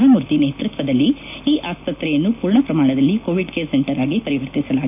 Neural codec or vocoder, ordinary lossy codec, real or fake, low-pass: none; none; real; 3.6 kHz